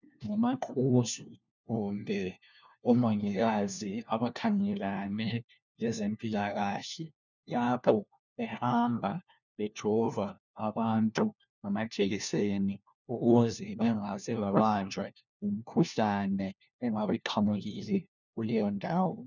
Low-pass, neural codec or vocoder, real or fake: 7.2 kHz; codec, 16 kHz, 1 kbps, FunCodec, trained on LibriTTS, 50 frames a second; fake